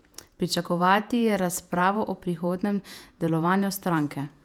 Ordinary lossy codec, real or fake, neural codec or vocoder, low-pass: none; fake; vocoder, 48 kHz, 128 mel bands, Vocos; 19.8 kHz